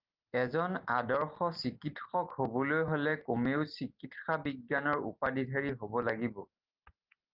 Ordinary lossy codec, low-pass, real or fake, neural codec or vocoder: Opus, 24 kbps; 5.4 kHz; real; none